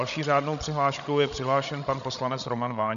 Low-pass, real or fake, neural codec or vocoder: 7.2 kHz; fake; codec, 16 kHz, 16 kbps, FreqCodec, larger model